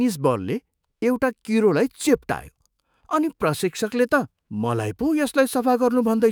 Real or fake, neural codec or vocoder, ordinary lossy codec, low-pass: fake; autoencoder, 48 kHz, 128 numbers a frame, DAC-VAE, trained on Japanese speech; none; none